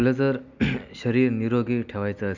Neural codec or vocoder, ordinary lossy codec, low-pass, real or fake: none; none; 7.2 kHz; real